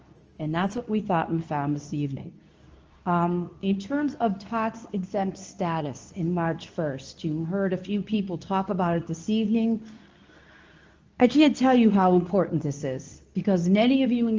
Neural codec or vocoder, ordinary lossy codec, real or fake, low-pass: codec, 24 kHz, 0.9 kbps, WavTokenizer, medium speech release version 2; Opus, 24 kbps; fake; 7.2 kHz